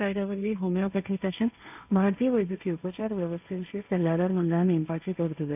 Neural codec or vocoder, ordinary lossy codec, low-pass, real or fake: codec, 16 kHz, 1.1 kbps, Voila-Tokenizer; none; 3.6 kHz; fake